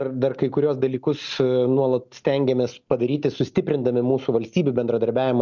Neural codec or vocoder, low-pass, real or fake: none; 7.2 kHz; real